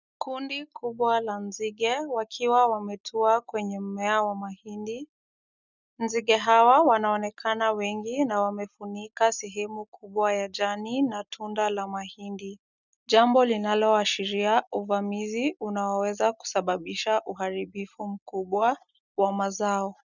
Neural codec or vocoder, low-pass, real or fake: none; 7.2 kHz; real